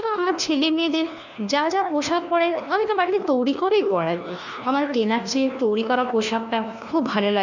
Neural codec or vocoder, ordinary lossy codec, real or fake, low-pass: codec, 16 kHz, 1 kbps, FunCodec, trained on LibriTTS, 50 frames a second; none; fake; 7.2 kHz